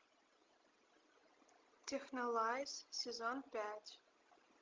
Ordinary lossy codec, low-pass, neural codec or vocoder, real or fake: Opus, 24 kbps; 7.2 kHz; none; real